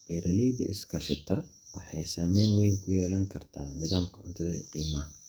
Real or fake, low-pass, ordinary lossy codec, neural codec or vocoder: fake; none; none; codec, 44.1 kHz, 2.6 kbps, SNAC